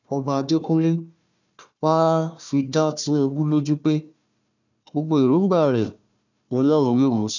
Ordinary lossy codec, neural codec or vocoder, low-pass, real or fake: none; codec, 16 kHz, 1 kbps, FunCodec, trained on Chinese and English, 50 frames a second; 7.2 kHz; fake